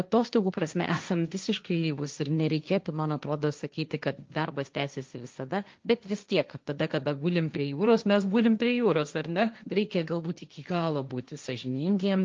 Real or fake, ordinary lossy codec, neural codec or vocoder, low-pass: fake; Opus, 24 kbps; codec, 16 kHz, 1.1 kbps, Voila-Tokenizer; 7.2 kHz